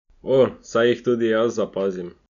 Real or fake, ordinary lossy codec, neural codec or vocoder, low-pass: real; none; none; 7.2 kHz